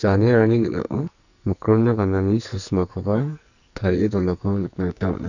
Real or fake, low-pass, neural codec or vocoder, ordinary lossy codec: fake; 7.2 kHz; codec, 44.1 kHz, 2.6 kbps, SNAC; none